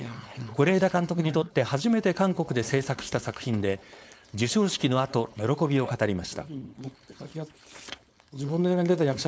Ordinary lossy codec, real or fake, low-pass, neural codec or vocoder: none; fake; none; codec, 16 kHz, 4.8 kbps, FACodec